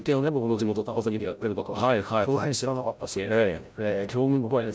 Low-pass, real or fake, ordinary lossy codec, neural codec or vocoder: none; fake; none; codec, 16 kHz, 0.5 kbps, FreqCodec, larger model